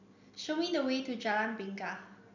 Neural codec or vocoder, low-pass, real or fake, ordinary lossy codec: none; 7.2 kHz; real; none